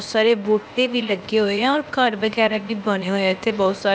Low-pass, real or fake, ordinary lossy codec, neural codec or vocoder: none; fake; none; codec, 16 kHz, 0.8 kbps, ZipCodec